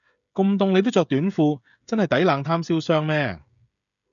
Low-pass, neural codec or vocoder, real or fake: 7.2 kHz; codec, 16 kHz, 16 kbps, FreqCodec, smaller model; fake